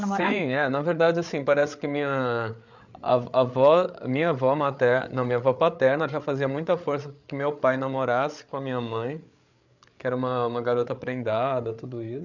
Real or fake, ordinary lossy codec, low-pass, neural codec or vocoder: fake; none; 7.2 kHz; codec, 16 kHz, 8 kbps, FreqCodec, larger model